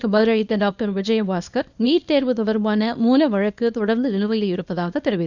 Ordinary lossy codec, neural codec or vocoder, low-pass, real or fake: none; codec, 24 kHz, 0.9 kbps, WavTokenizer, small release; 7.2 kHz; fake